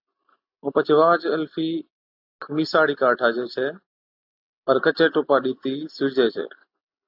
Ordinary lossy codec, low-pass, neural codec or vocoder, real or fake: AAC, 48 kbps; 5.4 kHz; none; real